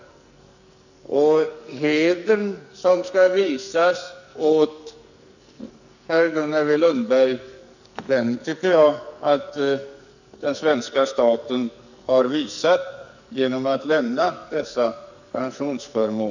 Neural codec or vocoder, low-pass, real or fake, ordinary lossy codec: codec, 44.1 kHz, 2.6 kbps, SNAC; 7.2 kHz; fake; none